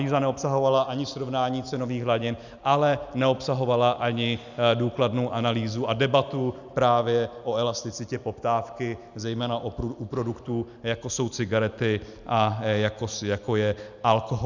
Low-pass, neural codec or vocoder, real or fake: 7.2 kHz; none; real